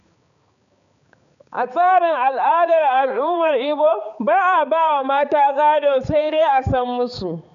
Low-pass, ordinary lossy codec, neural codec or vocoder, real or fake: 7.2 kHz; none; codec, 16 kHz, 4 kbps, X-Codec, HuBERT features, trained on balanced general audio; fake